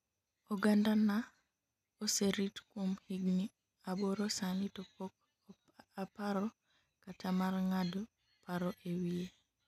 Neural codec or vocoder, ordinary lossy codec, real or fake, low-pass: none; none; real; 14.4 kHz